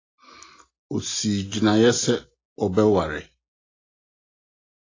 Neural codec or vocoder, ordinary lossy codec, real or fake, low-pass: none; AAC, 32 kbps; real; 7.2 kHz